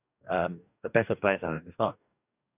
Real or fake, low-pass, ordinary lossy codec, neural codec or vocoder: fake; 3.6 kHz; none; codec, 44.1 kHz, 2.6 kbps, DAC